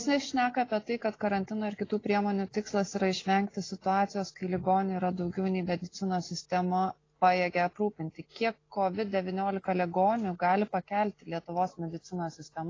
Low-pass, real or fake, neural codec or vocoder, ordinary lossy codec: 7.2 kHz; real; none; AAC, 32 kbps